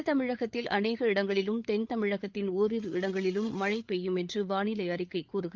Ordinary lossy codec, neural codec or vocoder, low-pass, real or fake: Opus, 32 kbps; codec, 16 kHz, 8 kbps, FreqCodec, larger model; 7.2 kHz; fake